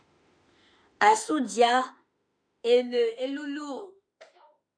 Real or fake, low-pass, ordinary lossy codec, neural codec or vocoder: fake; 9.9 kHz; MP3, 64 kbps; autoencoder, 48 kHz, 32 numbers a frame, DAC-VAE, trained on Japanese speech